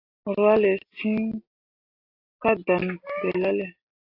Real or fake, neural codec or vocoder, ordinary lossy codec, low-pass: real; none; AAC, 32 kbps; 5.4 kHz